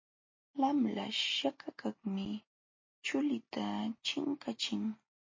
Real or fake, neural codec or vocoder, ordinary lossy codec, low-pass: real; none; MP3, 32 kbps; 7.2 kHz